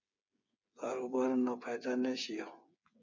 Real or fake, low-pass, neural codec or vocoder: fake; 7.2 kHz; codec, 16 kHz, 8 kbps, FreqCodec, smaller model